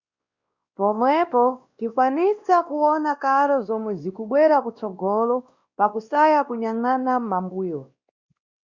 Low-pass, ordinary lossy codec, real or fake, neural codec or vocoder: 7.2 kHz; Opus, 64 kbps; fake; codec, 16 kHz, 1 kbps, X-Codec, WavLM features, trained on Multilingual LibriSpeech